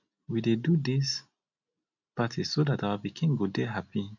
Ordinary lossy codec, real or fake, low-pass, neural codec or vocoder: none; real; 7.2 kHz; none